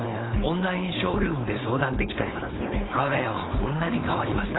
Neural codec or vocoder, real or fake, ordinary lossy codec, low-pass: codec, 16 kHz, 4.8 kbps, FACodec; fake; AAC, 16 kbps; 7.2 kHz